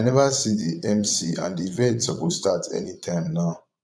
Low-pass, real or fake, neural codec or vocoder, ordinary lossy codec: none; fake; vocoder, 22.05 kHz, 80 mel bands, Vocos; none